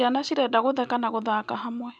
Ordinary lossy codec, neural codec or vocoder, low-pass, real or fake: none; none; none; real